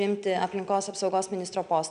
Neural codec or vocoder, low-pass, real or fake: none; 9.9 kHz; real